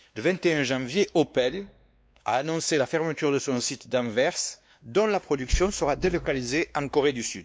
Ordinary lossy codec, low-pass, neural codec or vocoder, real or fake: none; none; codec, 16 kHz, 2 kbps, X-Codec, WavLM features, trained on Multilingual LibriSpeech; fake